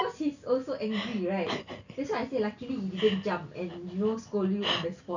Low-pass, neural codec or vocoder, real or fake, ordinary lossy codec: 7.2 kHz; none; real; none